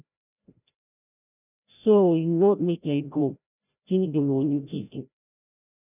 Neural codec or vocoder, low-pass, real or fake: codec, 16 kHz, 0.5 kbps, FreqCodec, larger model; 3.6 kHz; fake